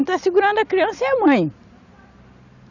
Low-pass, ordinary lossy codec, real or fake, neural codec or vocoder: 7.2 kHz; none; real; none